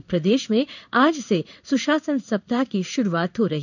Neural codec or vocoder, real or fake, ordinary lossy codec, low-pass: codec, 16 kHz in and 24 kHz out, 1 kbps, XY-Tokenizer; fake; none; 7.2 kHz